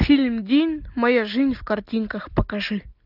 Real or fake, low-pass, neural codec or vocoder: real; 5.4 kHz; none